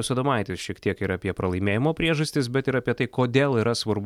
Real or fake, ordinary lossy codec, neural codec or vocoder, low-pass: real; MP3, 96 kbps; none; 19.8 kHz